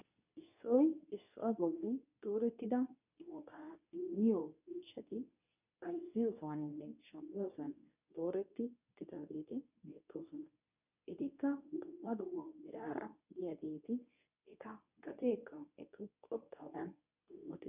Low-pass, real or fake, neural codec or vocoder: 3.6 kHz; fake; codec, 24 kHz, 0.9 kbps, WavTokenizer, medium speech release version 2